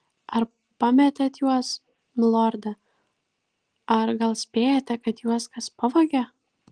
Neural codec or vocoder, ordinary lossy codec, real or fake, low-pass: none; Opus, 24 kbps; real; 9.9 kHz